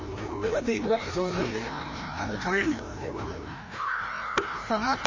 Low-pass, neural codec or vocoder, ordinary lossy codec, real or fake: 7.2 kHz; codec, 16 kHz, 1 kbps, FreqCodec, larger model; MP3, 32 kbps; fake